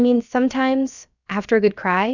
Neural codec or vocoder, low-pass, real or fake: codec, 16 kHz, about 1 kbps, DyCAST, with the encoder's durations; 7.2 kHz; fake